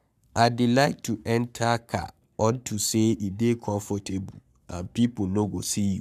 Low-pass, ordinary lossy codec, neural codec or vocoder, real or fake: 14.4 kHz; none; codec, 44.1 kHz, 7.8 kbps, Pupu-Codec; fake